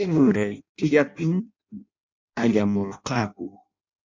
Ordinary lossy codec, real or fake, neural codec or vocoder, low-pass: MP3, 64 kbps; fake; codec, 16 kHz in and 24 kHz out, 0.6 kbps, FireRedTTS-2 codec; 7.2 kHz